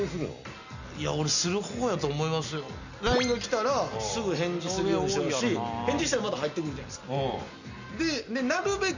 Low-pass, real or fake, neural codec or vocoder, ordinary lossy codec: 7.2 kHz; real; none; none